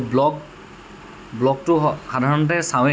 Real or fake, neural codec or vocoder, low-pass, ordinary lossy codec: real; none; none; none